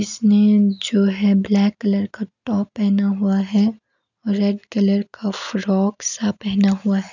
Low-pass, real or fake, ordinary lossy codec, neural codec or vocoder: 7.2 kHz; real; none; none